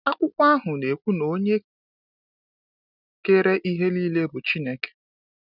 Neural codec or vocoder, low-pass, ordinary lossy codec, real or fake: none; 5.4 kHz; none; real